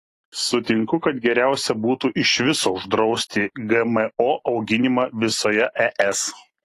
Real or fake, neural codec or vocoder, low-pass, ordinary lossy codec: real; none; 14.4 kHz; AAC, 48 kbps